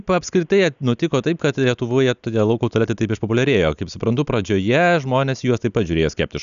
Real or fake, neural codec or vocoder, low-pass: real; none; 7.2 kHz